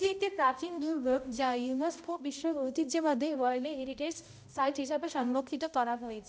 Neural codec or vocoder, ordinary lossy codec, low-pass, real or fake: codec, 16 kHz, 0.5 kbps, X-Codec, HuBERT features, trained on balanced general audio; none; none; fake